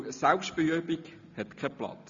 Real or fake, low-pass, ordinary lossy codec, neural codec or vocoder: real; 7.2 kHz; MP3, 64 kbps; none